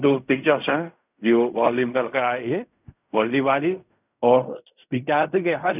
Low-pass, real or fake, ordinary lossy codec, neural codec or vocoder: 3.6 kHz; fake; none; codec, 16 kHz in and 24 kHz out, 0.4 kbps, LongCat-Audio-Codec, fine tuned four codebook decoder